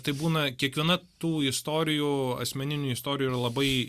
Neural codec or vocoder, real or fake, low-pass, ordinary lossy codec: none; real; 14.4 kHz; Opus, 64 kbps